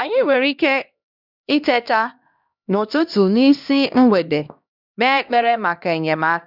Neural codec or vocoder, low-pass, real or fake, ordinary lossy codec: codec, 16 kHz, 1 kbps, X-Codec, HuBERT features, trained on LibriSpeech; 5.4 kHz; fake; none